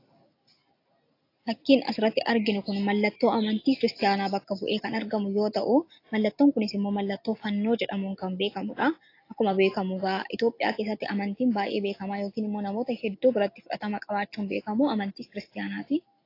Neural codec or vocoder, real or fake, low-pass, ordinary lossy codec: none; real; 5.4 kHz; AAC, 32 kbps